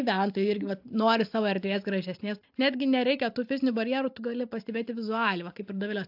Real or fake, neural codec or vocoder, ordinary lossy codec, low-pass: real; none; AAC, 48 kbps; 5.4 kHz